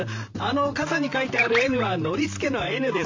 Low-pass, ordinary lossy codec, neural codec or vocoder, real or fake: 7.2 kHz; MP3, 48 kbps; vocoder, 44.1 kHz, 128 mel bands, Pupu-Vocoder; fake